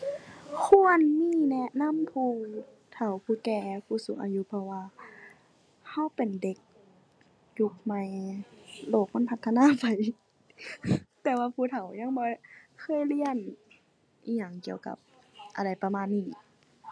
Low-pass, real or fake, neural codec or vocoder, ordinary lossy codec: none; real; none; none